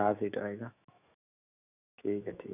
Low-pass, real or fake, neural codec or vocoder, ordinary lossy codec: 3.6 kHz; fake; autoencoder, 48 kHz, 128 numbers a frame, DAC-VAE, trained on Japanese speech; none